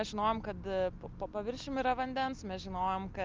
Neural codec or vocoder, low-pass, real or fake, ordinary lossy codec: none; 7.2 kHz; real; Opus, 24 kbps